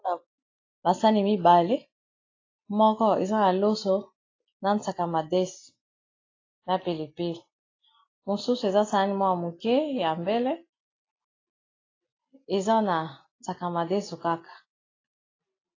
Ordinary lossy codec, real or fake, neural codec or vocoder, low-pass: AAC, 32 kbps; real; none; 7.2 kHz